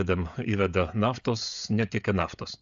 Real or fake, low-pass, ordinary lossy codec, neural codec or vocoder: fake; 7.2 kHz; AAC, 96 kbps; codec, 16 kHz, 16 kbps, FreqCodec, smaller model